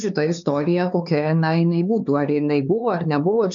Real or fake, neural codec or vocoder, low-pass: fake; codec, 16 kHz, 4 kbps, X-Codec, HuBERT features, trained on LibriSpeech; 7.2 kHz